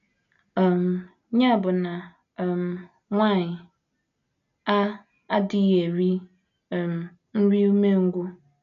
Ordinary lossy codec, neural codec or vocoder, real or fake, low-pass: none; none; real; 7.2 kHz